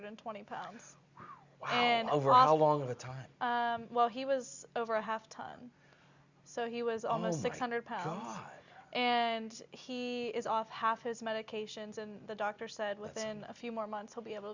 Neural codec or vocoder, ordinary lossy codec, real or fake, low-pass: none; Opus, 64 kbps; real; 7.2 kHz